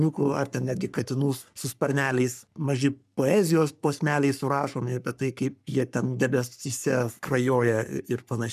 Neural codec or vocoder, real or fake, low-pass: codec, 44.1 kHz, 3.4 kbps, Pupu-Codec; fake; 14.4 kHz